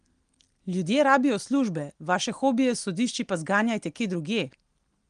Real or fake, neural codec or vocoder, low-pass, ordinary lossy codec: real; none; 9.9 kHz; Opus, 24 kbps